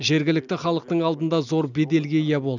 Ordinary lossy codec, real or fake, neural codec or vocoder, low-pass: none; real; none; 7.2 kHz